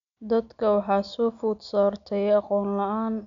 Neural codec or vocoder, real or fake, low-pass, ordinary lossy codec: none; real; 7.2 kHz; none